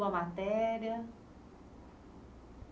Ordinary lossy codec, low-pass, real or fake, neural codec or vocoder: none; none; real; none